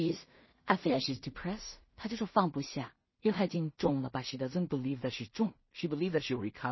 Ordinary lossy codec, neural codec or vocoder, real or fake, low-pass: MP3, 24 kbps; codec, 16 kHz in and 24 kHz out, 0.4 kbps, LongCat-Audio-Codec, two codebook decoder; fake; 7.2 kHz